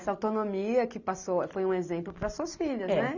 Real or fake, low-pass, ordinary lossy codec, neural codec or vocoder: real; 7.2 kHz; none; none